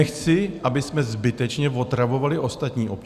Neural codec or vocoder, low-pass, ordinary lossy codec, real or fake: none; 14.4 kHz; Opus, 64 kbps; real